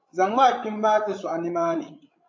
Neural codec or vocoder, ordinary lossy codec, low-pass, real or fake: codec, 16 kHz, 16 kbps, FreqCodec, larger model; MP3, 48 kbps; 7.2 kHz; fake